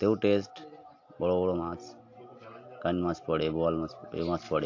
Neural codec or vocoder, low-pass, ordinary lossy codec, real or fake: none; 7.2 kHz; AAC, 48 kbps; real